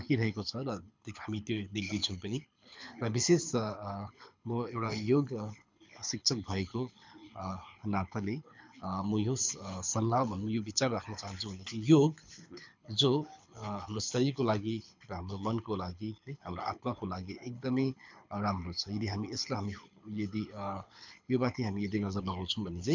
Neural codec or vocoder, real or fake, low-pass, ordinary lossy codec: codec, 24 kHz, 6 kbps, HILCodec; fake; 7.2 kHz; MP3, 64 kbps